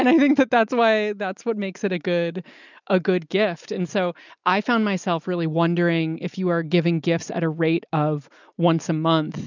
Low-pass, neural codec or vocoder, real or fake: 7.2 kHz; none; real